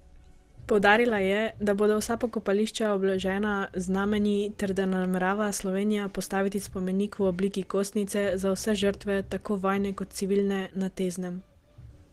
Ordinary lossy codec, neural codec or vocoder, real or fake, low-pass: Opus, 16 kbps; none; real; 14.4 kHz